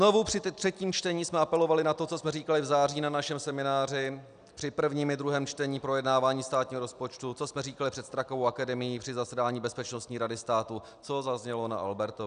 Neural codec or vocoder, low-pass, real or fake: none; 9.9 kHz; real